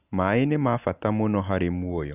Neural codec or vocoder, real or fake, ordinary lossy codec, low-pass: none; real; none; 3.6 kHz